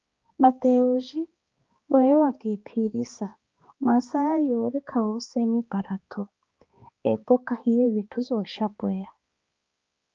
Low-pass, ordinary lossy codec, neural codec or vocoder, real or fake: 7.2 kHz; Opus, 24 kbps; codec, 16 kHz, 2 kbps, X-Codec, HuBERT features, trained on balanced general audio; fake